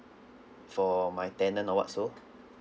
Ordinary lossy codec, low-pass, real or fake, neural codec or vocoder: none; none; real; none